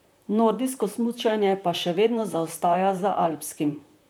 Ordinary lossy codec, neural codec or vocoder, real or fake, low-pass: none; vocoder, 44.1 kHz, 128 mel bands, Pupu-Vocoder; fake; none